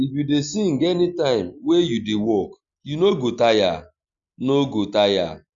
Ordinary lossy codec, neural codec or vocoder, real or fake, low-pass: Opus, 64 kbps; none; real; 7.2 kHz